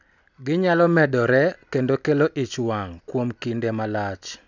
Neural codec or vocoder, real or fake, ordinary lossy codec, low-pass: none; real; none; 7.2 kHz